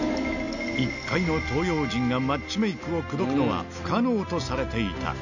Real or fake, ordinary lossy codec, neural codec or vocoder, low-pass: real; none; none; 7.2 kHz